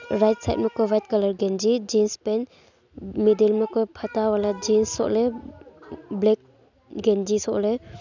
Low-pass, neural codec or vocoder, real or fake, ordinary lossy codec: 7.2 kHz; none; real; none